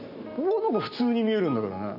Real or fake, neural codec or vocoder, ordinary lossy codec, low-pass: real; none; none; 5.4 kHz